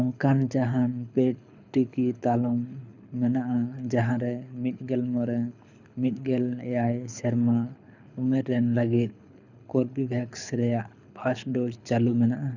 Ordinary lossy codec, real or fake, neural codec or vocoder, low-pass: none; fake; codec, 24 kHz, 6 kbps, HILCodec; 7.2 kHz